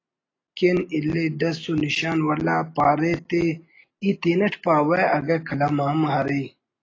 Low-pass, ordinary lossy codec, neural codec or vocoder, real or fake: 7.2 kHz; AAC, 32 kbps; none; real